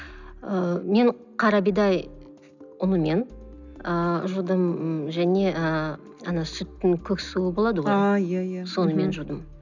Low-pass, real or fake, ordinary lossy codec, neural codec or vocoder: 7.2 kHz; real; none; none